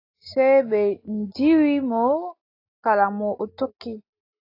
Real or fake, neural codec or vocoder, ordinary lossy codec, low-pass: real; none; AAC, 32 kbps; 5.4 kHz